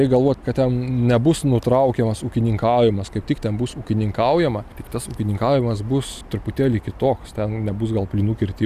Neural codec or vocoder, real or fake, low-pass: none; real; 14.4 kHz